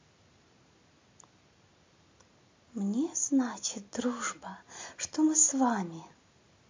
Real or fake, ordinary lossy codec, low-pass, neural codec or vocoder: real; MP3, 48 kbps; 7.2 kHz; none